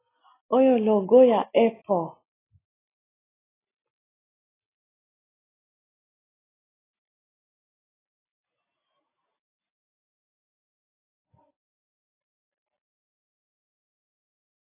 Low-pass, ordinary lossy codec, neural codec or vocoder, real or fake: 3.6 kHz; AAC, 16 kbps; none; real